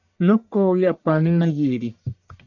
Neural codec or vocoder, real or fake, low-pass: codec, 44.1 kHz, 1.7 kbps, Pupu-Codec; fake; 7.2 kHz